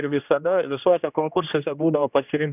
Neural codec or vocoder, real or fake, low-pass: codec, 16 kHz, 1 kbps, X-Codec, HuBERT features, trained on general audio; fake; 3.6 kHz